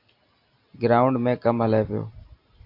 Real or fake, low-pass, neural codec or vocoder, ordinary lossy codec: real; 5.4 kHz; none; AAC, 32 kbps